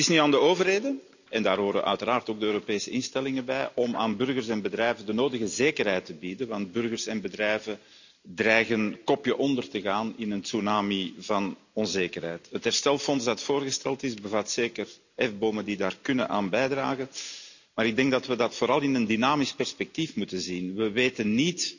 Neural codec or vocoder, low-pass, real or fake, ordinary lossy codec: none; 7.2 kHz; real; none